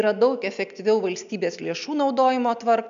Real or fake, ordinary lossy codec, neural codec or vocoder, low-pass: real; MP3, 64 kbps; none; 7.2 kHz